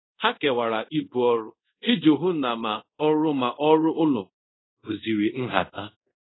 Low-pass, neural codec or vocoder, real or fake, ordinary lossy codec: 7.2 kHz; codec, 24 kHz, 0.5 kbps, DualCodec; fake; AAC, 16 kbps